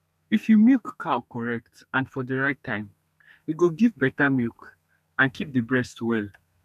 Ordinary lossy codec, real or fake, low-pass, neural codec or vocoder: none; fake; 14.4 kHz; codec, 32 kHz, 1.9 kbps, SNAC